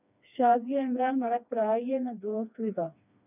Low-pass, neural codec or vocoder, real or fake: 3.6 kHz; codec, 16 kHz, 2 kbps, FreqCodec, smaller model; fake